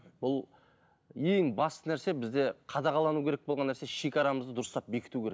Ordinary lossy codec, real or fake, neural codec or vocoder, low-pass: none; real; none; none